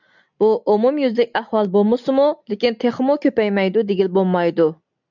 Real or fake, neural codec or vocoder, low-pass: real; none; 7.2 kHz